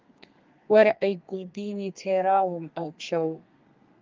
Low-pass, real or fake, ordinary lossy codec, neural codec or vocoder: 7.2 kHz; fake; Opus, 24 kbps; codec, 32 kHz, 1.9 kbps, SNAC